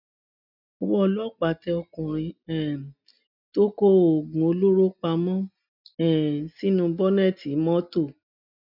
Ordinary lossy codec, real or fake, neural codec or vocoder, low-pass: none; real; none; 5.4 kHz